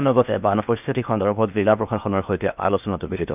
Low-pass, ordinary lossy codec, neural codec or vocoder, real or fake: 3.6 kHz; none; codec, 16 kHz in and 24 kHz out, 0.6 kbps, FocalCodec, streaming, 2048 codes; fake